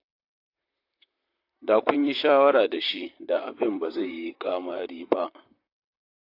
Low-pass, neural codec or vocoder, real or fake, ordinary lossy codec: 5.4 kHz; vocoder, 44.1 kHz, 128 mel bands, Pupu-Vocoder; fake; AAC, 32 kbps